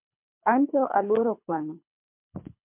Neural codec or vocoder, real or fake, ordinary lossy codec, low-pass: codec, 24 kHz, 3 kbps, HILCodec; fake; MP3, 32 kbps; 3.6 kHz